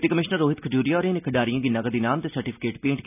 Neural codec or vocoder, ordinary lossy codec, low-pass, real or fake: none; none; 3.6 kHz; real